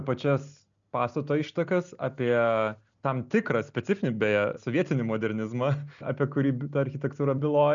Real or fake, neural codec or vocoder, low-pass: real; none; 7.2 kHz